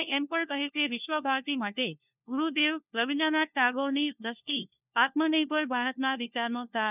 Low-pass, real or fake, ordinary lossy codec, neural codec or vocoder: 3.6 kHz; fake; none; codec, 16 kHz, 1 kbps, FunCodec, trained on LibriTTS, 50 frames a second